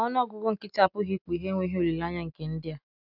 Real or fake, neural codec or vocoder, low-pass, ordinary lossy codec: real; none; 5.4 kHz; none